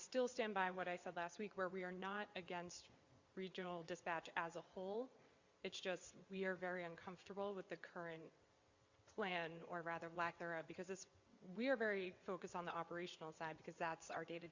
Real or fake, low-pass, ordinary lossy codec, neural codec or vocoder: fake; 7.2 kHz; Opus, 64 kbps; vocoder, 22.05 kHz, 80 mel bands, WaveNeXt